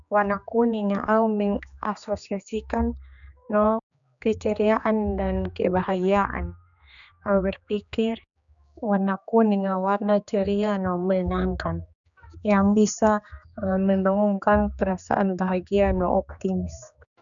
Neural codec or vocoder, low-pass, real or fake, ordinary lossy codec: codec, 16 kHz, 2 kbps, X-Codec, HuBERT features, trained on general audio; 7.2 kHz; fake; none